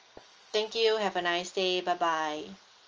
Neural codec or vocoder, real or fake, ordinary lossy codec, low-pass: none; real; Opus, 24 kbps; 7.2 kHz